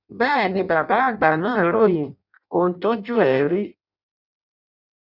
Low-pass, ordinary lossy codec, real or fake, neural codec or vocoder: 5.4 kHz; none; fake; codec, 16 kHz in and 24 kHz out, 0.6 kbps, FireRedTTS-2 codec